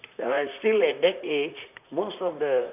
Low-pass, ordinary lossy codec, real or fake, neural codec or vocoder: 3.6 kHz; none; fake; vocoder, 44.1 kHz, 128 mel bands, Pupu-Vocoder